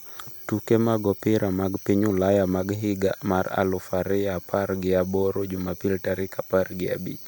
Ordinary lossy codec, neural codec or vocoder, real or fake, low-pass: none; none; real; none